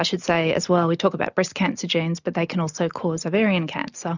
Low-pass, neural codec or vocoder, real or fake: 7.2 kHz; none; real